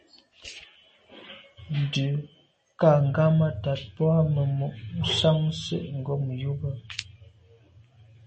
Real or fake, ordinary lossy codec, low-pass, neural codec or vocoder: real; MP3, 32 kbps; 10.8 kHz; none